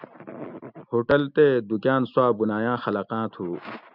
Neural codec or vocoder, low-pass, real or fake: none; 5.4 kHz; real